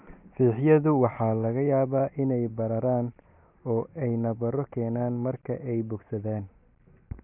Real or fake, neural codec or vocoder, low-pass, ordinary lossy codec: real; none; 3.6 kHz; none